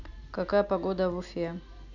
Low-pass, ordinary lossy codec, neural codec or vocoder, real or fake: 7.2 kHz; none; none; real